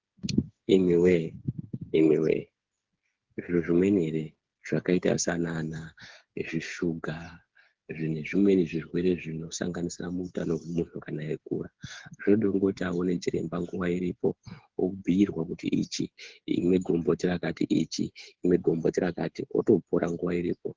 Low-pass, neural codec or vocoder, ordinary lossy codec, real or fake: 7.2 kHz; codec, 16 kHz, 8 kbps, FreqCodec, smaller model; Opus, 16 kbps; fake